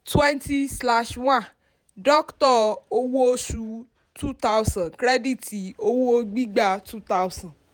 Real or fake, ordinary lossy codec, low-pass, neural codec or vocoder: fake; none; none; vocoder, 48 kHz, 128 mel bands, Vocos